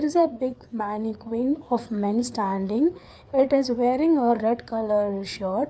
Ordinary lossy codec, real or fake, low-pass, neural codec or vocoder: none; fake; none; codec, 16 kHz, 4 kbps, FreqCodec, larger model